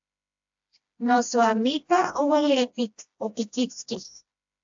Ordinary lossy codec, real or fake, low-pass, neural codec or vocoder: AAC, 64 kbps; fake; 7.2 kHz; codec, 16 kHz, 1 kbps, FreqCodec, smaller model